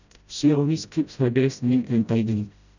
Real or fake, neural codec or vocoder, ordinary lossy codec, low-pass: fake; codec, 16 kHz, 0.5 kbps, FreqCodec, smaller model; none; 7.2 kHz